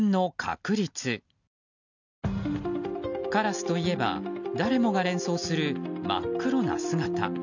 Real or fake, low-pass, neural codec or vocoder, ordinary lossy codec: real; 7.2 kHz; none; none